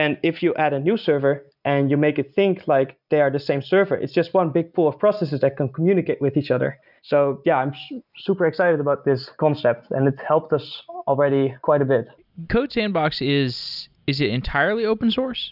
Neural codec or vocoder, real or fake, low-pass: none; real; 5.4 kHz